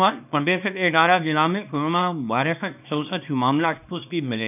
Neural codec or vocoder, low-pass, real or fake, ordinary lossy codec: codec, 24 kHz, 0.9 kbps, WavTokenizer, small release; 3.6 kHz; fake; none